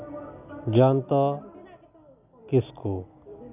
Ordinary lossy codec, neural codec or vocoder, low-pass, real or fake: none; none; 3.6 kHz; real